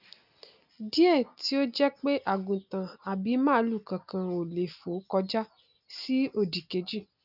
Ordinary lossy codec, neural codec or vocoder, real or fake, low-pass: none; none; real; 5.4 kHz